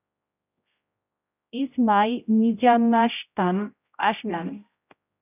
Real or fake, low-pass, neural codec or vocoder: fake; 3.6 kHz; codec, 16 kHz, 0.5 kbps, X-Codec, HuBERT features, trained on general audio